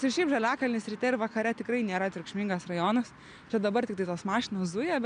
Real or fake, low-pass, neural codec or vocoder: real; 9.9 kHz; none